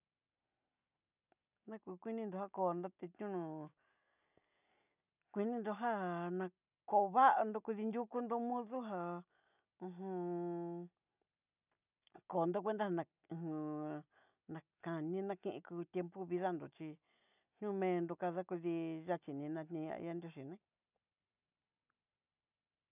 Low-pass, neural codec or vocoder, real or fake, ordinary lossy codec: 3.6 kHz; none; real; none